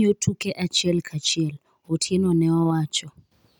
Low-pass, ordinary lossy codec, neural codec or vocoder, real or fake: 19.8 kHz; none; none; real